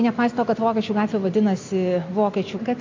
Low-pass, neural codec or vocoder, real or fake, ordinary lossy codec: 7.2 kHz; none; real; MP3, 48 kbps